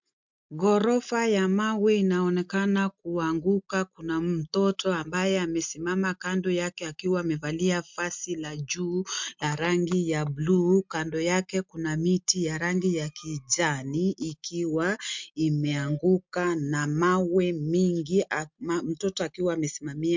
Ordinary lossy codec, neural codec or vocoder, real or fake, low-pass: MP3, 64 kbps; none; real; 7.2 kHz